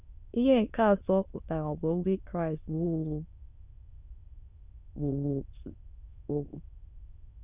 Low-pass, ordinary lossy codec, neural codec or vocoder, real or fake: 3.6 kHz; Opus, 64 kbps; autoencoder, 22.05 kHz, a latent of 192 numbers a frame, VITS, trained on many speakers; fake